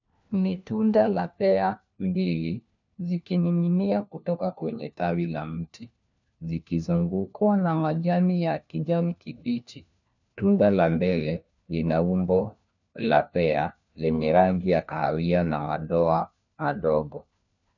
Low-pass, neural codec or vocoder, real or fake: 7.2 kHz; codec, 16 kHz, 1 kbps, FunCodec, trained on LibriTTS, 50 frames a second; fake